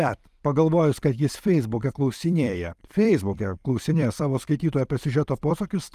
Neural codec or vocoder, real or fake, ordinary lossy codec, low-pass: vocoder, 44.1 kHz, 128 mel bands every 256 samples, BigVGAN v2; fake; Opus, 32 kbps; 14.4 kHz